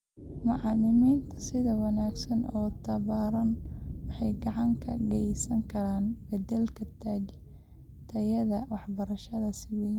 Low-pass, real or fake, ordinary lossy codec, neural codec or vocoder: 19.8 kHz; real; Opus, 32 kbps; none